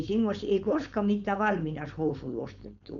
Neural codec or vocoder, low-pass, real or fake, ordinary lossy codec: codec, 16 kHz, 4.8 kbps, FACodec; 7.2 kHz; fake; none